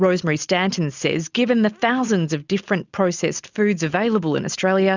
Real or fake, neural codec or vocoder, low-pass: real; none; 7.2 kHz